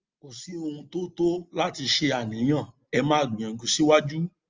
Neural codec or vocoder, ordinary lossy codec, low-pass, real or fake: none; Opus, 32 kbps; 7.2 kHz; real